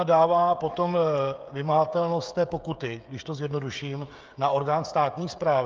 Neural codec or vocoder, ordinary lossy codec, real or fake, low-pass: codec, 16 kHz, 16 kbps, FreqCodec, smaller model; Opus, 24 kbps; fake; 7.2 kHz